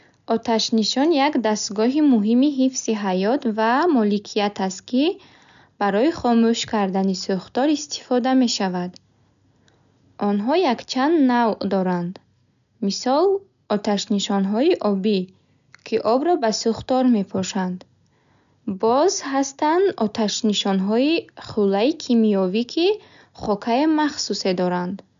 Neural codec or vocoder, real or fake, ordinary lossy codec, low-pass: none; real; MP3, 96 kbps; 7.2 kHz